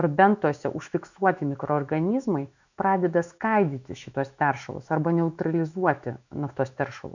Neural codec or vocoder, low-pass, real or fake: autoencoder, 48 kHz, 128 numbers a frame, DAC-VAE, trained on Japanese speech; 7.2 kHz; fake